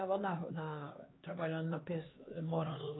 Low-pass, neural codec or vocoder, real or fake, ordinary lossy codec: 7.2 kHz; codec, 16 kHz, 2 kbps, X-Codec, HuBERT features, trained on LibriSpeech; fake; AAC, 16 kbps